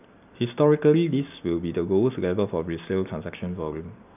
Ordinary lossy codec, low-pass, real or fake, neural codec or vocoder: none; 3.6 kHz; real; none